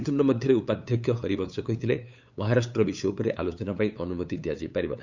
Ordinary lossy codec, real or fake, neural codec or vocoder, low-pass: none; fake; codec, 16 kHz, 8 kbps, FunCodec, trained on LibriTTS, 25 frames a second; 7.2 kHz